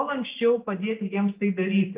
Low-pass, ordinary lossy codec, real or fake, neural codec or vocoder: 3.6 kHz; Opus, 24 kbps; real; none